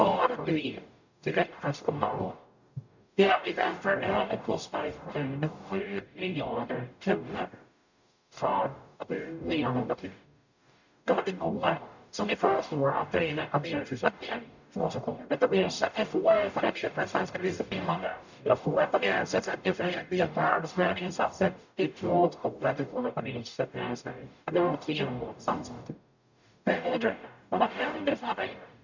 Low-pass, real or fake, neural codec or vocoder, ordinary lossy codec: 7.2 kHz; fake; codec, 44.1 kHz, 0.9 kbps, DAC; none